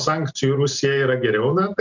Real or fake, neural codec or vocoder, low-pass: real; none; 7.2 kHz